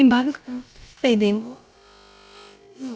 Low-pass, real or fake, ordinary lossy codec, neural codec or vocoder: none; fake; none; codec, 16 kHz, about 1 kbps, DyCAST, with the encoder's durations